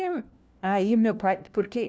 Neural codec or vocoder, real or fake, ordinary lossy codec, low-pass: codec, 16 kHz, 1 kbps, FunCodec, trained on LibriTTS, 50 frames a second; fake; none; none